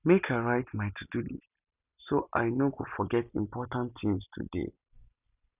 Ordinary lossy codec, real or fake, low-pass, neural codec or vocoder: none; real; 3.6 kHz; none